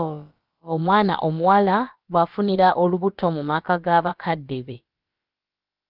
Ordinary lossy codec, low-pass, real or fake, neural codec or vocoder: Opus, 24 kbps; 5.4 kHz; fake; codec, 16 kHz, about 1 kbps, DyCAST, with the encoder's durations